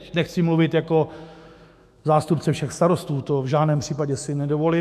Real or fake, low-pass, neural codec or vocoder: fake; 14.4 kHz; autoencoder, 48 kHz, 128 numbers a frame, DAC-VAE, trained on Japanese speech